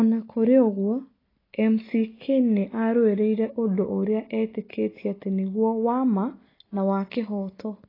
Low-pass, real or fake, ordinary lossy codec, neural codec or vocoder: 5.4 kHz; real; AAC, 24 kbps; none